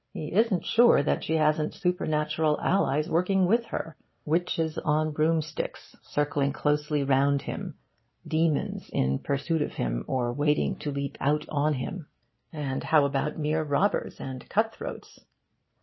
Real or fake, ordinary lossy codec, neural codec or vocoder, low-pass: real; MP3, 24 kbps; none; 7.2 kHz